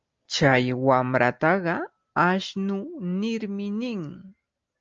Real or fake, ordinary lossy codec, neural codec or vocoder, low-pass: real; Opus, 24 kbps; none; 7.2 kHz